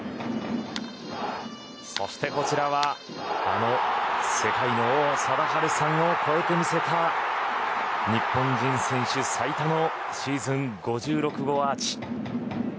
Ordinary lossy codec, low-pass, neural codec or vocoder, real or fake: none; none; none; real